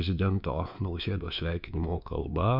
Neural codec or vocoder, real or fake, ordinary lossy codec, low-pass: codec, 24 kHz, 3.1 kbps, DualCodec; fake; MP3, 48 kbps; 5.4 kHz